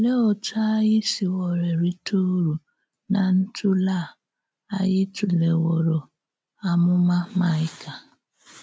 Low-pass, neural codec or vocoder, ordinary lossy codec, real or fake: none; none; none; real